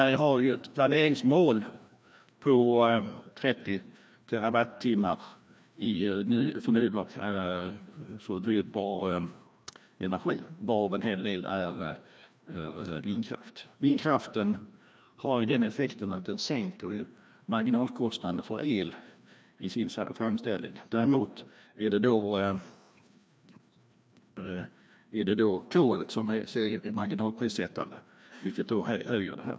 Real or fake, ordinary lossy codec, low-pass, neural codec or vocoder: fake; none; none; codec, 16 kHz, 1 kbps, FreqCodec, larger model